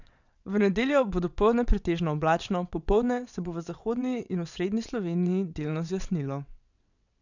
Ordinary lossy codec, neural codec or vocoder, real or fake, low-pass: none; vocoder, 22.05 kHz, 80 mel bands, WaveNeXt; fake; 7.2 kHz